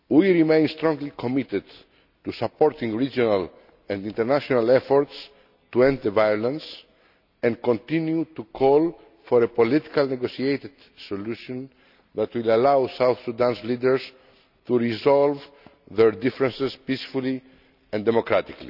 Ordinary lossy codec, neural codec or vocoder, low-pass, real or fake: none; none; 5.4 kHz; real